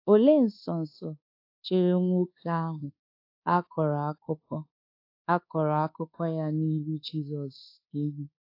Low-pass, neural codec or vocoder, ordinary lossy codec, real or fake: 5.4 kHz; codec, 24 kHz, 1.2 kbps, DualCodec; AAC, 32 kbps; fake